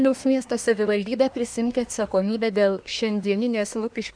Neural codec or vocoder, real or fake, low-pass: codec, 24 kHz, 1 kbps, SNAC; fake; 9.9 kHz